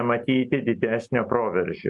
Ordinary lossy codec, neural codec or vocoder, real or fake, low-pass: MP3, 96 kbps; none; real; 10.8 kHz